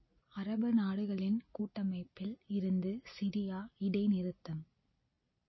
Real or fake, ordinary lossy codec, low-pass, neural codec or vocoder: real; MP3, 24 kbps; 7.2 kHz; none